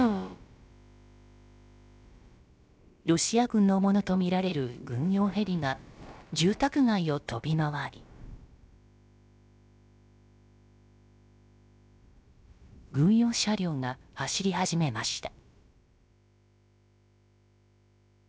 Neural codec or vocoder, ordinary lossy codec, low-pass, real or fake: codec, 16 kHz, about 1 kbps, DyCAST, with the encoder's durations; none; none; fake